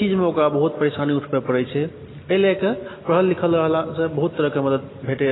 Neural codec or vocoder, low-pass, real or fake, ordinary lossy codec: none; 7.2 kHz; real; AAC, 16 kbps